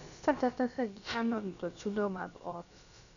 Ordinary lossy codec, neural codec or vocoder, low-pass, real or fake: none; codec, 16 kHz, about 1 kbps, DyCAST, with the encoder's durations; 7.2 kHz; fake